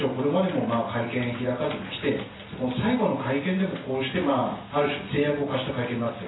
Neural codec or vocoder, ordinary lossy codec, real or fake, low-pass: none; AAC, 16 kbps; real; 7.2 kHz